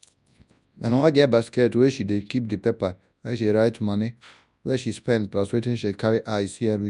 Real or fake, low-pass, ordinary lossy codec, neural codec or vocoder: fake; 10.8 kHz; none; codec, 24 kHz, 0.9 kbps, WavTokenizer, large speech release